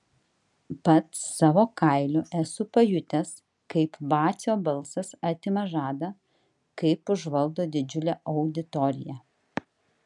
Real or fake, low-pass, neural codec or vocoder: real; 10.8 kHz; none